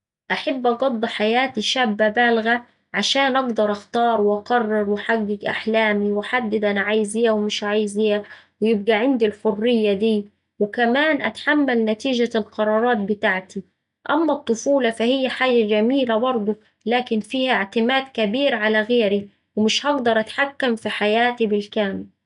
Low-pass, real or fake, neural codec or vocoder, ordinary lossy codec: 10.8 kHz; real; none; none